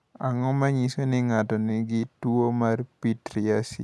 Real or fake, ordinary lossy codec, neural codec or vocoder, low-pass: real; none; none; none